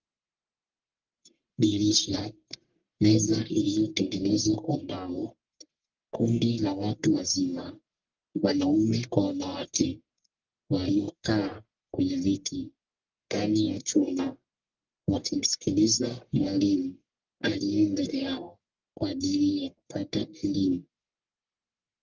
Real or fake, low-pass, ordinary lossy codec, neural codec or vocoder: fake; 7.2 kHz; Opus, 32 kbps; codec, 44.1 kHz, 1.7 kbps, Pupu-Codec